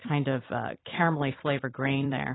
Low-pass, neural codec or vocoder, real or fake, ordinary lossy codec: 7.2 kHz; vocoder, 44.1 kHz, 128 mel bands every 256 samples, BigVGAN v2; fake; AAC, 16 kbps